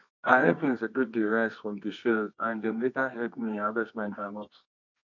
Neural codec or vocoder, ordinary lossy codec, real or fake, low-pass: codec, 24 kHz, 0.9 kbps, WavTokenizer, medium music audio release; MP3, 64 kbps; fake; 7.2 kHz